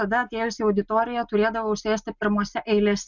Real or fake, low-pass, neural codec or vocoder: real; 7.2 kHz; none